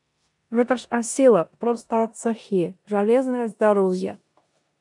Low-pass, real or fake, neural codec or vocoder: 10.8 kHz; fake; codec, 16 kHz in and 24 kHz out, 0.9 kbps, LongCat-Audio-Codec, four codebook decoder